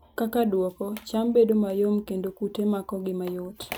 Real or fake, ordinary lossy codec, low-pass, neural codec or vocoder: real; none; none; none